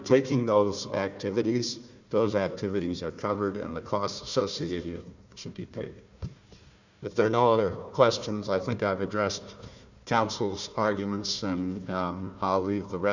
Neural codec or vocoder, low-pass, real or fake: codec, 16 kHz, 1 kbps, FunCodec, trained on Chinese and English, 50 frames a second; 7.2 kHz; fake